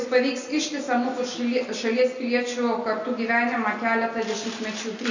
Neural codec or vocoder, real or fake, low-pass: none; real; 7.2 kHz